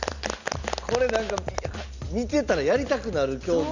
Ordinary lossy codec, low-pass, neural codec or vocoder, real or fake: none; 7.2 kHz; none; real